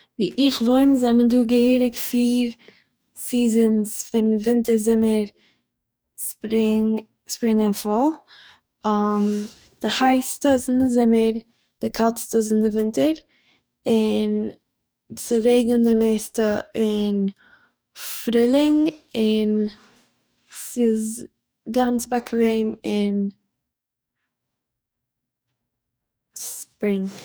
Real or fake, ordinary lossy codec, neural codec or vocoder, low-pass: fake; none; codec, 44.1 kHz, 2.6 kbps, DAC; none